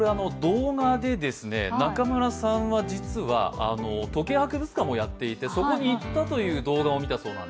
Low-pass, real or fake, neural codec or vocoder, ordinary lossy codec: none; real; none; none